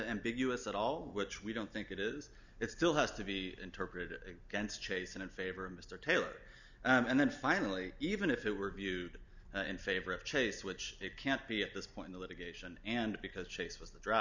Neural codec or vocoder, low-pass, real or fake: none; 7.2 kHz; real